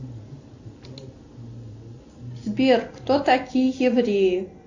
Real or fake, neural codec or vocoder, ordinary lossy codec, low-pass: real; none; AAC, 48 kbps; 7.2 kHz